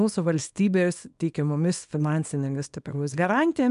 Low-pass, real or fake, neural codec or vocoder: 10.8 kHz; fake; codec, 24 kHz, 0.9 kbps, WavTokenizer, small release